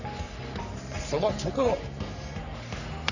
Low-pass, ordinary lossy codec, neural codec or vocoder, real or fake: 7.2 kHz; none; codec, 44.1 kHz, 3.4 kbps, Pupu-Codec; fake